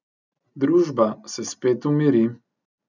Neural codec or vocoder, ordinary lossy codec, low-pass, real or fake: none; none; 7.2 kHz; real